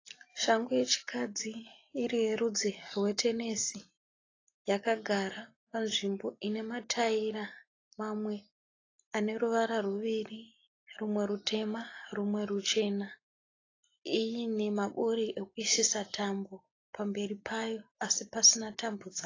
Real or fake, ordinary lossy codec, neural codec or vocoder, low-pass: real; AAC, 32 kbps; none; 7.2 kHz